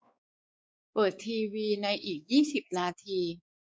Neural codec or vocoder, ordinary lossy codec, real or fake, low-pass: codec, 16 kHz, 4 kbps, X-Codec, WavLM features, trained on Multilingual LibriSpeech; none; fake; none